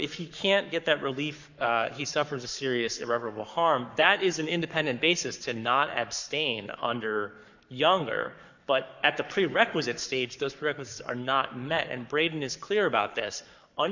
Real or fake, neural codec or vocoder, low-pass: fake; codec, 44.1 kHz, 7.8 kbps, Pupu-Codec; 7.2 kHz